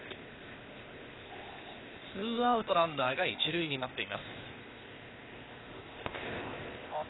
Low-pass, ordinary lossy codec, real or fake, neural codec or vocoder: 7.2 kHz; AAC, 16 kbps; fake; codec, 16 kHz, 0.8 kbps, ZipCodec